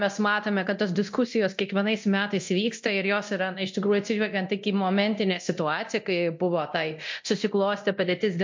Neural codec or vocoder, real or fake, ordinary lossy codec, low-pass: codec, 24 kHz, 0.9 kbps, DualCodec; fake; MP3, 48 kbps; 7.2 kHz